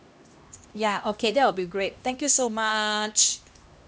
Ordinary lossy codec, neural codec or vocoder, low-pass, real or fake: none; codec, 16 kHz, 1 kbps, X-Codec, HuBERT features, trained on LibriSpeech; none; fake